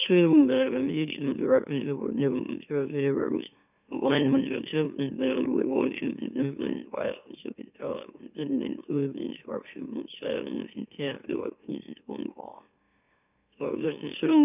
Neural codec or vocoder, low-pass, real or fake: autoencoder, 44.1 kHz, a latent of 192 numbers a frame, MeloTTS; 3.6 kHz; fake